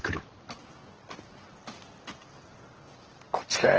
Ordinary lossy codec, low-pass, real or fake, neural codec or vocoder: Opus, 16 kbps; 7.2 kHz; real; none